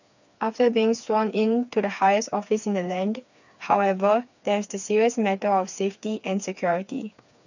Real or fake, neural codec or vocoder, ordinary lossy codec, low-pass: fake; codec, 16 kHz, 4 kbps, FreqCodec, smaller model; none; 7.2 kHz